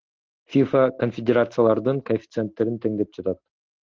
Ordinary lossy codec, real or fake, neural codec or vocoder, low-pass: Opus, 16 kbps; real; none; 7.2 kHz